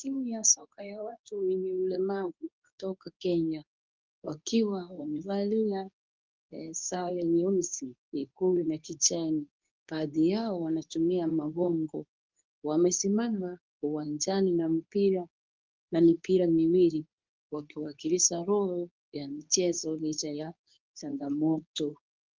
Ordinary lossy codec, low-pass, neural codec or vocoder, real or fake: Opus, 24 kbps; 7.2 kHz; codec, 24 kHz, 0.9 kbps, WavTokenizer, medium speech release version 2; fake